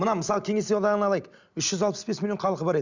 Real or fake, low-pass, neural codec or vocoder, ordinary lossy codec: real; 7.2 kHz; none; Opus, 64 kbps